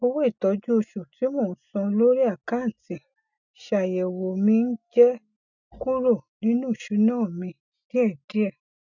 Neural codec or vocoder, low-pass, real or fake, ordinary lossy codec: none; 7.2 kHz; real; none